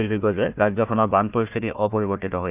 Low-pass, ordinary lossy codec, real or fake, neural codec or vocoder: 3.6 kHz; none; fake; codec, 16 kHz, 1 kbps, FunCodec, trained on Chinese and English, 50 frames a second